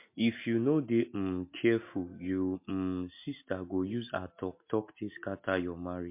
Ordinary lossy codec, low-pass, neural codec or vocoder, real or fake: MP3, 24 kbps; 3.6 kHz; none; real